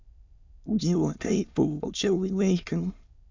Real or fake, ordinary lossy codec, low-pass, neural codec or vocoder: fake; MP3, 64 kbps; 7.2 kHz; autoencoder, 22.05 kHz, a latent of 192 numbers a frame, VITS, trained on many speakers